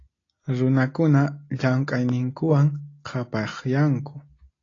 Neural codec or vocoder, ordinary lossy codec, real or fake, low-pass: none; AAC, 32 kbps; real; 7.2 kHz